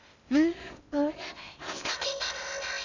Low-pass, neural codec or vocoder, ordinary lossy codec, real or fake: 7.2 kHz; codec, 16 kHz in and 24 kHz out, 0.6 kbps, FocalCodec, streaming, 4096 codes; none; fake